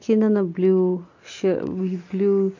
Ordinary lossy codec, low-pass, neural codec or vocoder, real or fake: MP3, 48 kbps; 7.2 kHz; none; real